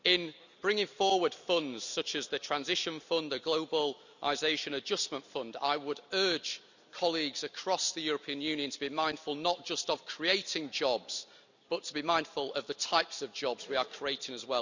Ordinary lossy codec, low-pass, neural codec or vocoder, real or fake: none; 7.2 kHz; none; real